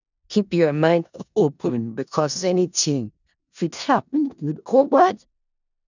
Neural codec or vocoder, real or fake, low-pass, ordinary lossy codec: codec, 16 kHz in and 24 kHz out, 0.4 kbps, LongCat-Audio-Codec, four codebook decoder; fake; 7.2 kHz; none